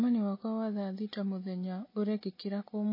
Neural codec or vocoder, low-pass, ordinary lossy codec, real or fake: none; 5.4 kHz; MP3, 24 kbps; real